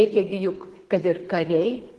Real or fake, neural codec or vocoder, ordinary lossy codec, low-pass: fake; codec, 24 kHz, 3 kbps, HILCodec; Opus, 16 kbps; 10.8 kHz